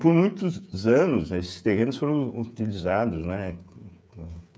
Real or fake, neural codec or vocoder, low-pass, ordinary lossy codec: fake; codec, 16 kHz, 8 kbps, FreqCodec, smaller model; none; none